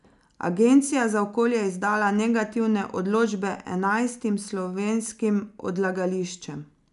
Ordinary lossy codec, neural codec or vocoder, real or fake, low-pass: none; none; real; 10.8 kHz